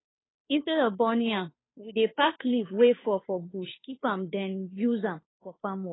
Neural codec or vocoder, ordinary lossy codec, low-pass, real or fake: codec, 16 kHz, 2 kbps, FunCodec, trained on Chinese and English, 25 frames a second; AAC, 16 kbps; 7.2 kHz; fake